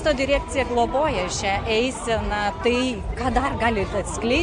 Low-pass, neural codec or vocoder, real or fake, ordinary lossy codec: 9.9 kHz; none; real; MP3, 96 kbps